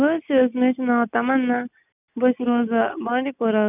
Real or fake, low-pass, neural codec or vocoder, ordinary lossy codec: real; 3.6 kHz; none; none